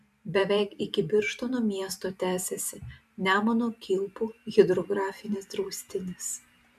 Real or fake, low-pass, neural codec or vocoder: fake; 14.4 kHz; vocoder, 44.1 kHz, 128 mel bands every 512 samples, BigVGAN v2